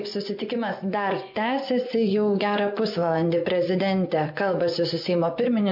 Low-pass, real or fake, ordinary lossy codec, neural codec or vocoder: 5.4 kHz; fake; MP3, 48 kbps; vocoder, 24 kHz, 100 mel bands, Vocos